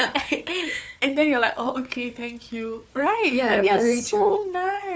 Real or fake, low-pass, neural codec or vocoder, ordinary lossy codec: fake; none; codec, 16 kHz, 4 kbps, FunCodec, trained on Chinese and English, 50 frames a second; none